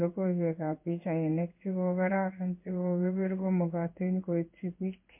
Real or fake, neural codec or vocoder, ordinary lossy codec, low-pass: fake; codec, 16 kHz in and 24 kHz out, 1 kbps, XY-Tokenizer; MP3, 32 kbps; 3.6 kHz